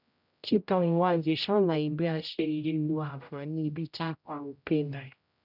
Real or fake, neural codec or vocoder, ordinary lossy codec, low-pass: fake; codec, 16 kHz, 0.5 kbps, X-Codec, HuBERT features, trained on general audio; none; 5.4 kHz